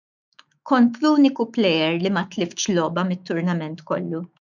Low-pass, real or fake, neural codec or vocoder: 7.2 kHz; fake; autoencoder, 48 kHz, 128 numbers a frame, DAC-VAE, trained on Japanese speech